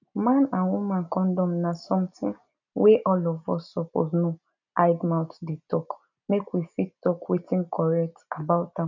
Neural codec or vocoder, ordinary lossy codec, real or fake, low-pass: none; none; real; 7.2 kHz